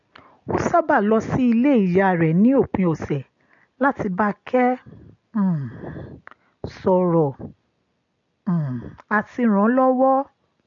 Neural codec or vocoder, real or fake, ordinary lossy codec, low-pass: none; real; MP3, 48 kbps; 7.2 kHz